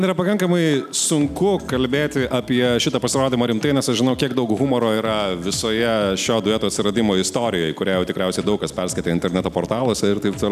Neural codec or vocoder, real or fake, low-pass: none; real; 14.4 kHz